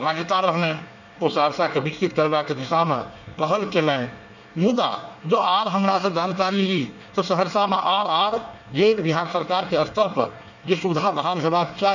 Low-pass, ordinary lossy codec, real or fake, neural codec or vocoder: 7.2 kHz; none; fake; codec, 24 kHz, 1 kbps, SNAC